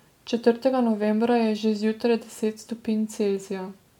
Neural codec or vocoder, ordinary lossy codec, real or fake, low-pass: none; MP3, 96 kbps; real; 19.8 kHz